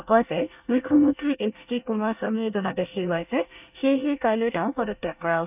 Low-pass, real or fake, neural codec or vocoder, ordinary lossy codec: 3.6 kHz; fake; codec, 24 kHz, 1 kbps, SNAC; none